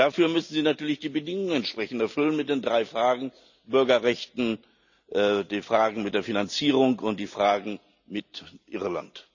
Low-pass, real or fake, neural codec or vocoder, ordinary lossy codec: 7.2 kHz; real; none; none